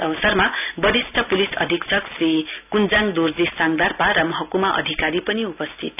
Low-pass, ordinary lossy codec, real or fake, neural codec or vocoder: 3.6 kHz; none; real; none